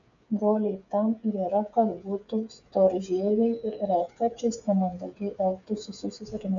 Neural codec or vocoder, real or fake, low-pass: codec, 16 kHz, 8 kbps, FreqCodec, smaller model; fake; 7.2 kHz